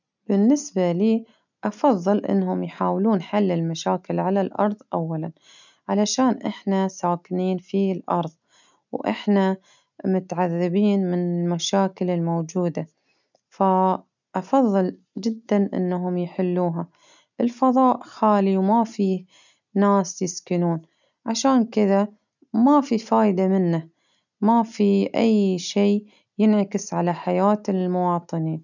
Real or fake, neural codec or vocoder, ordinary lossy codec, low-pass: real; none; none; 7.2 kHz